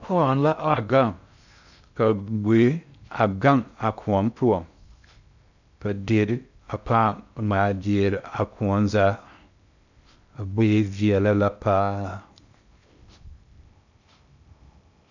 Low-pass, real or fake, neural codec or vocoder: 7.2 kHz; fake; codec, 16 kHz in and 24 kHz out, 0.6 kbps, FocalCodec, streaming, 2048 codes